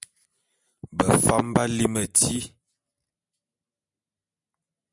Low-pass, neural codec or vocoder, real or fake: 10.8 kHz; none; real